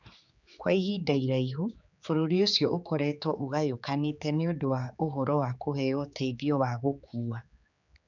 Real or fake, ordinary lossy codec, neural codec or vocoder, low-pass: fake; none; codec, 16 kHz, 4 kbps, X-Codec, HuBERT features, trained on general audio; 7.2 kHz